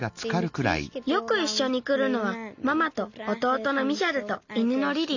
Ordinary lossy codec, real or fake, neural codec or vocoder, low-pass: none; real; none; 7.2 kHz